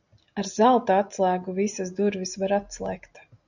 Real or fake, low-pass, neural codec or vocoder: real; 7.2 kHz; none